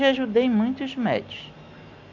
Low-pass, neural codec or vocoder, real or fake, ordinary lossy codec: 7.2 kHz; none; real; none